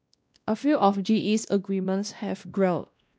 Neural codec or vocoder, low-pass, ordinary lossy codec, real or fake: codec, 16 kHz, 1 kbps, X-Codec, WavLM features, trained on Multilingual LibriSpeech; none; none; fake